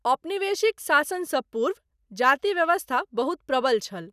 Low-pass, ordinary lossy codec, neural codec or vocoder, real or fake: 14.4 kHz; none; none; real